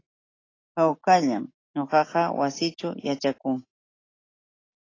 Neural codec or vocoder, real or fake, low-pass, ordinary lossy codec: none; real; 7.2 kHz; AAC, 32 kbps